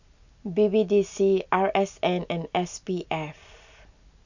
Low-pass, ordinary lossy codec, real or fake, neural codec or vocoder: 7.2 kHz; none; real; none